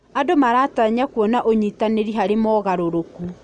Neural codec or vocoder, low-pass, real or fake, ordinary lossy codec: none; 9.9 kHz; real; none